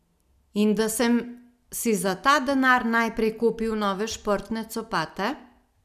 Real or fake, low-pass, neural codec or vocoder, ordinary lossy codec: real; 14.4 kHz; none; none